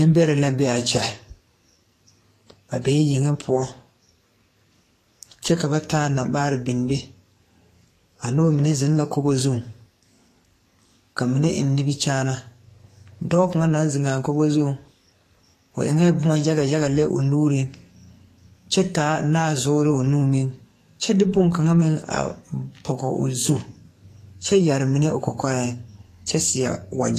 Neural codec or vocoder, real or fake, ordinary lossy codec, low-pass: codec, 44.1 kHz, 2.6 kbps, SNAC; fake; AAC, 48 kbps; 14.4 kHz